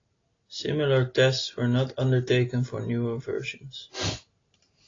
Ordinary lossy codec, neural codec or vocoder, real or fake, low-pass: AAC, 32 kbps; none; real; 7.2 kHz